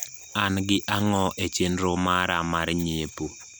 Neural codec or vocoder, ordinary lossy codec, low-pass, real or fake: none; none; none; real